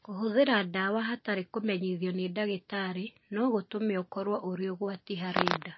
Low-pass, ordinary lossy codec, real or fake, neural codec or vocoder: 7.2 kHz; MP3, 24 kbps; real; none